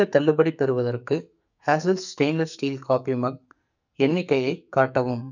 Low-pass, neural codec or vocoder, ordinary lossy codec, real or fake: 7.2 kHz; codec, 44.1 kHz, 2.6 kbps, SNAC; none; fake